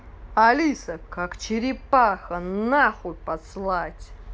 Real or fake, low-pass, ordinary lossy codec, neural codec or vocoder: real; none; none; none